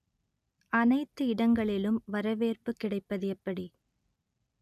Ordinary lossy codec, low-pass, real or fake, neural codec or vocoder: none; 14.4 kHz; real; none